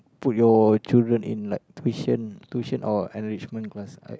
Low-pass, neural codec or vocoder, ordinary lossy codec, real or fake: none; none; none; real